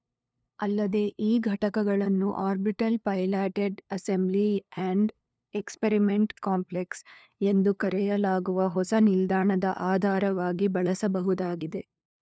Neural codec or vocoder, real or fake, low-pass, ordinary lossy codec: codec, 16 kHz, 2 kbps, FunCodec, trained on LibriTTS, 25 frames a second; fake; none; none